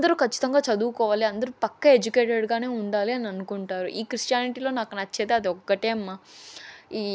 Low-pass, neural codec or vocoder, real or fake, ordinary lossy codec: none; none; real; none